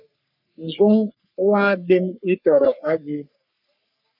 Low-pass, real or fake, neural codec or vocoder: 5.4 kHz; fake; codec, 44.1 kHz, 3.4 kbps, Pupu-Codec